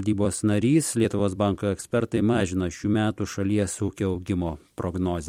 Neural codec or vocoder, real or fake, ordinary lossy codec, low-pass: vocoder, 44.1 kHz, 128 mel bands every 256 samples, BigVGAN v2; fake; MP3, 64 kbps; 19.8 kHz